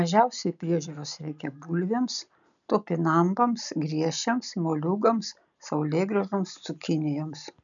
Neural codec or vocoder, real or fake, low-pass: none; real; 7.2 kHz